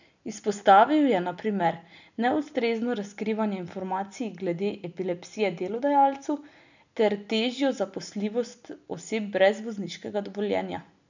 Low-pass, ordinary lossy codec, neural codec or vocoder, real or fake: 7.2 kHz; none; none; real